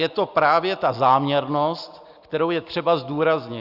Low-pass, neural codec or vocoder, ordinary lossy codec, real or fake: 5.4 kHz; none; Opus, 64 kbps; real